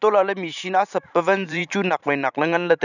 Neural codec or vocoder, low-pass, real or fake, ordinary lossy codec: vocoder, 44.1 kHz, 128 mel bands every 512 samples, BigVGAN v2; 7.2 kHz; fake; none